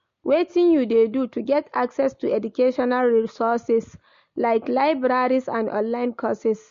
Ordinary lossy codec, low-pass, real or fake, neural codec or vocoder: MP3, 48 kbps; 7.2 kHz; real; none